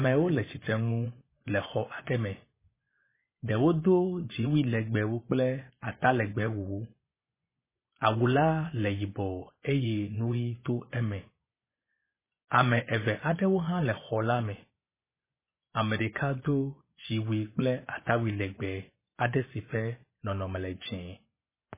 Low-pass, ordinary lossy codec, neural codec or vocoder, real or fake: 3.6 kHz; MP3, 16 kbps; vocoder, 24 kHz, 100 mel bands, Vocos; fake